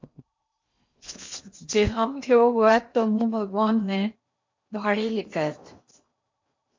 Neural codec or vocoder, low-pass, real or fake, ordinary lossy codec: codec, 16 kHz in and 24 kHz out, 0.8 kbps, FocalCodec, streaming, 65536 codes; 7.2 kHz; fake; MP3, 48 kbps